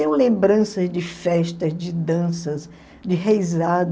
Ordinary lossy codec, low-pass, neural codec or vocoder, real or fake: none; none; none; real